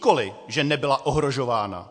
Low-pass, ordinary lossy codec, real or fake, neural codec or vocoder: 9.9 kHz; MP3, 48 kbps; real; none